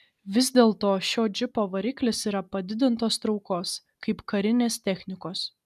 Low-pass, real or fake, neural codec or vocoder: 14.4 kHz; real; none